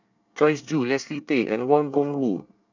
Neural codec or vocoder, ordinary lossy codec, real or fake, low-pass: codec, 24 kHz, 1 kbps, SNAC; none; fake; 7.2 kHz